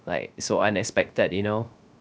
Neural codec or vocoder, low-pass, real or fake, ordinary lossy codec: codec, 16 kHz, 0.3 kbps, FocalCodec; none; fake; none